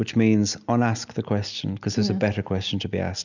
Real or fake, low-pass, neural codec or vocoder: real; 7.2 kHz; none